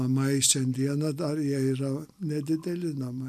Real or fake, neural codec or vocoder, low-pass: real; none; 14.4 kHz